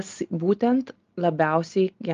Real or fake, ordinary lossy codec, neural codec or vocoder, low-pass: real; Opus, 32 kbps; none; 7.2 kHz